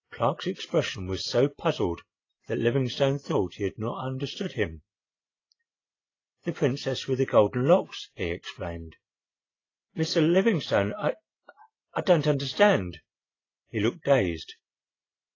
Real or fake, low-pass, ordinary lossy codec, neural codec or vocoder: real; 7.2 kHz; AAC, 32 kbps; none